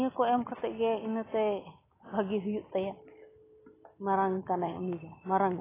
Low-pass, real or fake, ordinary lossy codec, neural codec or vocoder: 3.6 kHz; real; AAC, 16 kbps; none